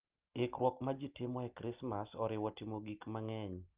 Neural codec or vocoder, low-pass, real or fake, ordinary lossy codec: none; 3.6 kHz; real; Opus, 32 kbps